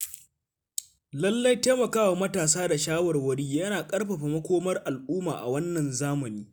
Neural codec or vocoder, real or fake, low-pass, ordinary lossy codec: none; real; none; none